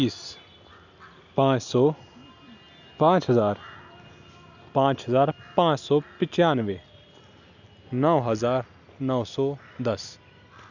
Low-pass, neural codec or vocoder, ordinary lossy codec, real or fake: 7.2 kHz; none; none; real